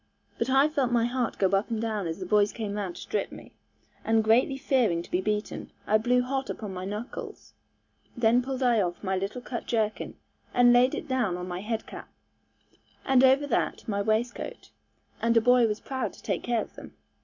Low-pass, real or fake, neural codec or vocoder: 7.2 kHz; real; none